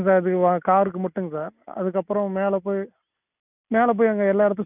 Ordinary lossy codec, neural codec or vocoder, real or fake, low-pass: none; none; real; 3.6 kHz